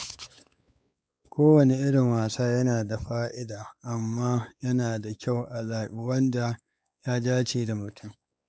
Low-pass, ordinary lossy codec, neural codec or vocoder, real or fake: none; none; codec, 16 kHz, 4 kbps, X-Codec, WavLM features, trained on Multilingual LibriSpeech; fake